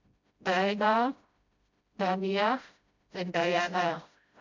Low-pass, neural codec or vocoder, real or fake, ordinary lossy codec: 7.2 kHz; codec, 16 kHz, 0.5 kbps, FreqCodec, smaller model; fake; MP3, 64 kbps